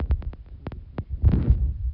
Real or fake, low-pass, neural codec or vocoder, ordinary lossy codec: fake; 5.4 kHz; vocoder, 44.1 kHz, 128 mel bands every 256 samples, BigVGAN v2; none